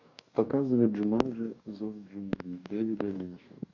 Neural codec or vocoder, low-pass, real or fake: codec, 44.1 kHz, 2.6 kbps, DAC; 7.2 kHz; fake